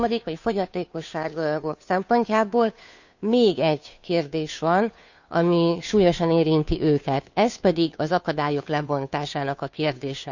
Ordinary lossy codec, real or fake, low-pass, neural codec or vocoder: none; fake; 7.2 kHz; codec, 16 kHz, 2 kbps, FunCodec, trained on Chinese and English, 25 frames a second